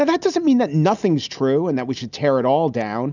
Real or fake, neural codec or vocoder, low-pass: real; none; 7.2 kHz